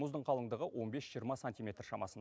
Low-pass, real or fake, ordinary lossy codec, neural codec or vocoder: none; real; none; none